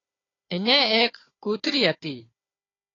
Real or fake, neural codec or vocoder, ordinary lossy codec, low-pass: fake; codec, 16 kHz, 4 kbps, FunCodec, trained on Chinese and English, 50 frames a second; AAC, 32 kbps; 7.2 kHz